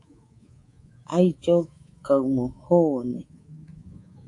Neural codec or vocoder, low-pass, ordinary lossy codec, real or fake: codec, 24 kHz, 3.1 kbps, DualCodec; 10.8 kHz; AAC, 48 kbps; fake